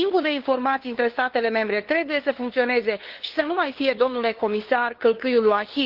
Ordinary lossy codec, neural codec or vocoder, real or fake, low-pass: Opus, 16 kbps; codec, 16 kHz, 2 kbps, FunCodec, trained on LibriTTS, 25 frames a second; fake; 5.4 kHz